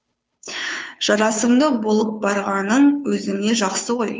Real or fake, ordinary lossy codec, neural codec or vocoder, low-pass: fake; none; codec, 16 kHz, 2 kbps, FunCodec, trained on Chinese and English, 25 frames a second; none